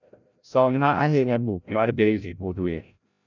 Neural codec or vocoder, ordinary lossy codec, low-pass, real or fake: codec, 16 kHz, 0.5 kbps, FreqCodec, larger model; none; 7.2 kHz; fake